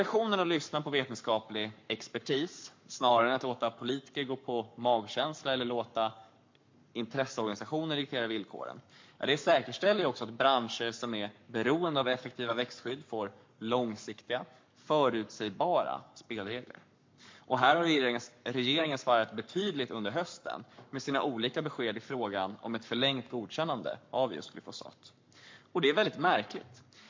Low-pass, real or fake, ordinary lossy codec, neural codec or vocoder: 7.2 kHz; fake; MP3, 48 kbps; codec, 44.1 kHz, 7.8 kbps, Pupu-Codec